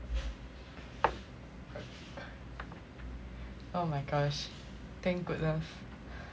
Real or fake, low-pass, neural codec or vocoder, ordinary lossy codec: real; none; none; none